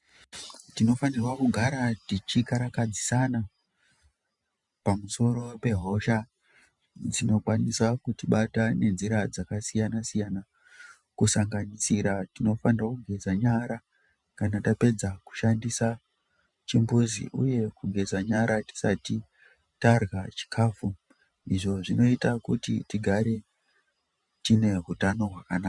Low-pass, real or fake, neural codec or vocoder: 10.8 kHz; fake; vocoder, 44.1 kHz, 128 mel bands every 512 samples, BigVGAN v2